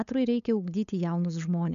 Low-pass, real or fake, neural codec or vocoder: 7.2 kHz; fake; codec, 16 kHz, 8 kbps, FunCodec, trained on LibriTTS, 25 frames a second